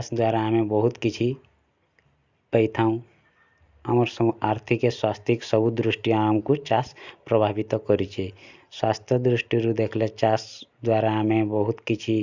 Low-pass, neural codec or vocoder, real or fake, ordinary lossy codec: 7.2 kHz; none; real; Opus, 64 kbps